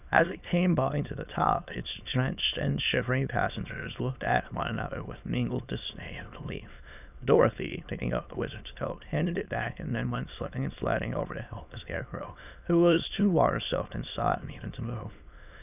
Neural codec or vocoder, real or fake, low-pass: autoencoder, 22.05 kHz, a latent of 192 numbers a frame, VITS, trained on many speakers; fake; 3.6 kHz